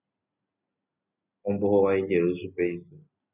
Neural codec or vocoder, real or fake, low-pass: none; real; 3.6 kHz